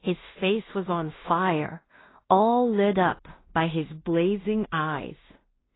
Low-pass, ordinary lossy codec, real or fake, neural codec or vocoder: 7.2 kHz; AAC, 16 kbps; fake; codec, 16 kHz in and 24 kHz out, 0.4 kbps, LongCat-Audio-Codec, two codebook decoder